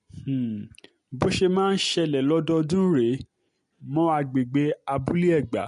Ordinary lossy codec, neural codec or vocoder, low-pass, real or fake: MP3, 48 kbps; none; 14.4 kHz; real